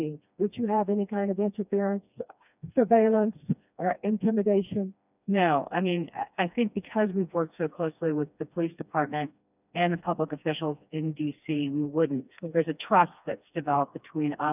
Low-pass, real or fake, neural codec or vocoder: 3.6 kHz; fake; codec, 16 kHz, 2 kbps, FreqCodec, smaller model